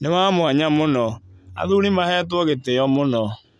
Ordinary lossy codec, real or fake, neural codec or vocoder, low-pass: none; real; none; none